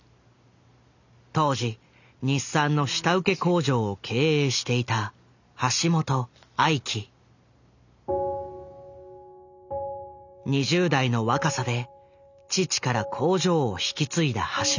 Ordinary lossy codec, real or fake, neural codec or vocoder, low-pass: none; real; none; 7.2 kHz